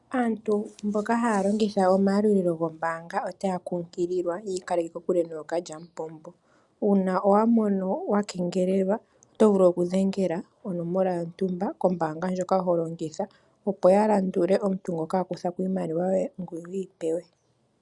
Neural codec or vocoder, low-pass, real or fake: none; 10.8 kHz; real